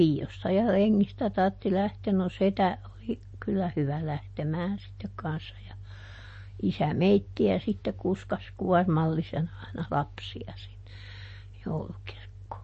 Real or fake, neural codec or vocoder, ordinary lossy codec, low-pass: real; none; MP3, 32 kbps; 10.8 kHz